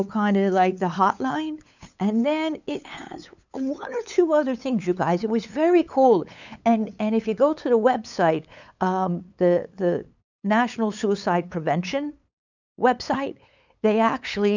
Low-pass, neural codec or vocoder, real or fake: 7.2 kHz; codec, 16 kHz, 8 kbps, FunCodec, trained on LibriTTS, 25 frames a second; fake